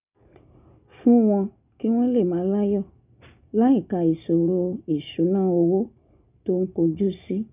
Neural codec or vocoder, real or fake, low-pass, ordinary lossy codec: none; real; 3.6 kHz; none